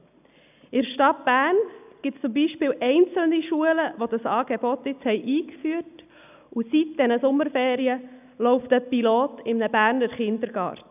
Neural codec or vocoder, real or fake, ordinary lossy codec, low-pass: none; real; none; 3.6 kHz